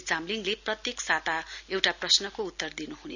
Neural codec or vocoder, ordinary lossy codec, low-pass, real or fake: none; none; 7.2 kHz; real